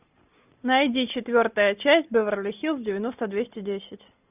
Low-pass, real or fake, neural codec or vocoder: 3.6 kHz; real; none